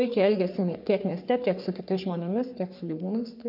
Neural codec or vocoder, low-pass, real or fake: codec, 44.1 kHz, 3.4 kbps, Pupu-Codec; 5.4 kHz; fake